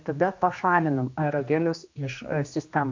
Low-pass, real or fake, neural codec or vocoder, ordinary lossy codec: 7.2 kHz; fake; codec, 16 kHz, 2 kbps, X-Codec, HuBERT features, trained on general audio; AAC, 48 kbps